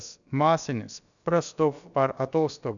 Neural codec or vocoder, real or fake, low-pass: codec, 16 kHz, about 1 kbps, DyCAST, with the encoder's durations; fake; 7.2 kHz